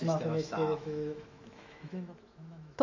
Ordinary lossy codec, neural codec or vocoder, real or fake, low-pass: AAC, 48 kbps; autoencoder, 48 kHz, 128 numbers a frame, DAC-VAE, trained on Japanese speech; fake; 7.2 kHz